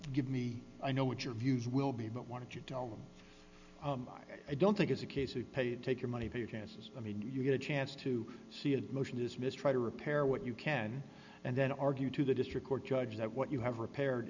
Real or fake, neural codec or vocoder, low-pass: real; none; 7.2 kHz